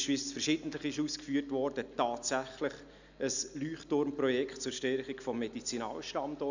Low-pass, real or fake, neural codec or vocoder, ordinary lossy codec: 7.2 kHz; real; none; none